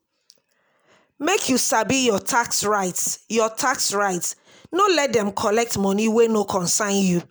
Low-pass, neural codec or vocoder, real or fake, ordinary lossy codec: none; none; real; none